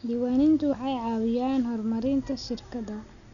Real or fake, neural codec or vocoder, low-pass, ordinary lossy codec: real; none; 7.2 kHz; none